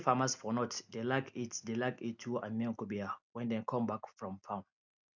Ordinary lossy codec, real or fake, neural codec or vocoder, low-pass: none; real; none; 7.2 kHz